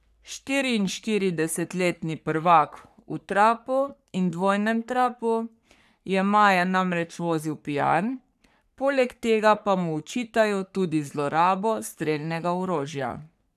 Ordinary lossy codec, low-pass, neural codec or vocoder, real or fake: none; 14.4 kHz; codec, 44.1 kHz, 3.4 kbps, Pupu-Codec; fake